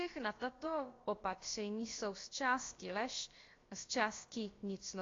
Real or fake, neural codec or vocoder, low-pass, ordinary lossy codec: fake; codec, 16 kHz, about 1 kbps, DyCAST, with the encoder's durations; 7.2 kHz; AAC, 32 kbps